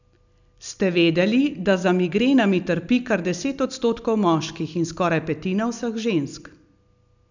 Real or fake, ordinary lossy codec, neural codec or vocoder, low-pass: real; none; none; 7.2 kHz